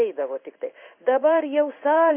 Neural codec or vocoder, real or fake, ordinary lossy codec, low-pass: codec, 16 kHz in and 24 kHz out, 1 kbps, XY-Tokenizer; fake; MP3, 32 kbps; 3.6 kHz